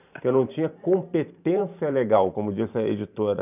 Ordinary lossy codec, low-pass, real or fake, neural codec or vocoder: none; 3.6 kHz; fake; vocoder, 44.1 kHz, 128 mel bands every 512 samples, BigVGAN v2